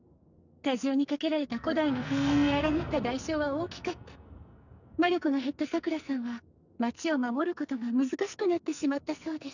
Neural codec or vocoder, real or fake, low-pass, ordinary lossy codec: codec, 44.1 kHz, 2.6 kbps, SNAC; fake; 7.2 kHz; none